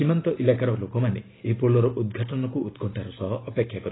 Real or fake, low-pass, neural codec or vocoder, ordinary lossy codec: real; 7.2 kHz; none; AAC, 16 kbps